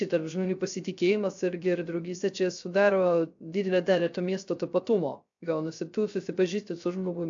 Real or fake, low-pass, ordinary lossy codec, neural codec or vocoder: fake; 7.2 kHz; AAC, 64 kbps; codec, 16 kHz, 0.3 kbps, FocalCodec